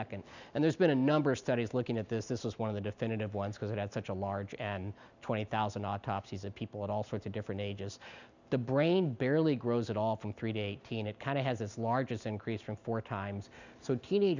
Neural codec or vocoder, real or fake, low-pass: none; real; 7.2 kHz